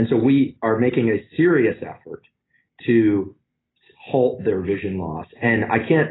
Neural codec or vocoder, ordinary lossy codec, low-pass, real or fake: none; AAC, 16 kbps; 7.2 kHz; real